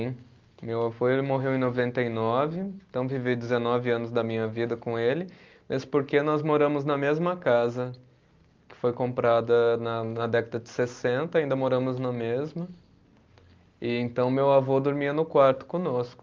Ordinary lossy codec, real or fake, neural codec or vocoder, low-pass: Opus, 32 kbps; real; none; 7.2 kHz